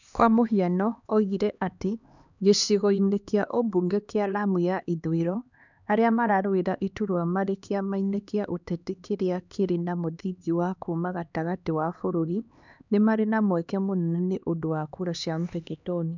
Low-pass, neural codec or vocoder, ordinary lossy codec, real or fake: 7.2 kHz; codec, 16 kHz, 2 kbps, X-Codec, HuBERT features, trained on LibriSpeech; none; fake